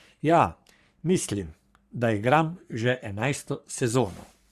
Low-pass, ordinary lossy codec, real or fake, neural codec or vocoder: 14.4 kHz; Opus, 64 kbps; fake; codec, 44.1 kHz, 3.4 kbps, Pupu-Codec